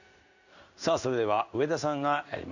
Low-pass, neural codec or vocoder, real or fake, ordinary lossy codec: 7.2 kHz; codec, 16 kHz in and 24 kHz out, 1 kbps, XY-Tokenizer; fake; none